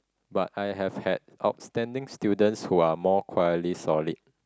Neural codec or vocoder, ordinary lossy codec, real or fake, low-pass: none; none; real; none